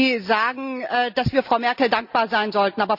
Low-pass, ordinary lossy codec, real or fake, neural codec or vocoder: 5.4 kHz; none; real; none